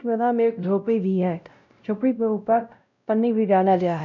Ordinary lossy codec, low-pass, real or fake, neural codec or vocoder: none; 7.2 kHz; fake; codec, 16 kHz, 0.5 kbps, X-Codec, WavLM features, trained on Multilingual LibriSpeech